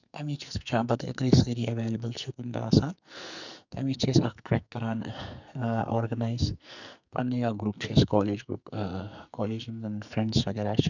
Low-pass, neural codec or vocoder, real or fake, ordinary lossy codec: 7.2 kHz; codec, 44.1 kHz, 2.6 kbps, SNAC; fake; none